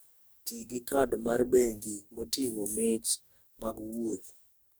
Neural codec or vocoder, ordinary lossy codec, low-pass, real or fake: codec, 44.1 kHz, 2.6 kbps, DAC; none; none; fake